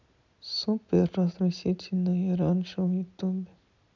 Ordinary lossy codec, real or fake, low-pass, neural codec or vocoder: none; real; 7.2 kHz; none